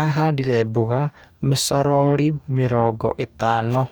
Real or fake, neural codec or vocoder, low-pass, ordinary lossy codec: fake; codec, 44.1 kHz, 2.6 kbps, DAC; none; none